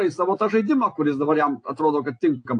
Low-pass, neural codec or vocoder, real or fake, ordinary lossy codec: 9.9 kHz; none; real; AAC, 48 kbps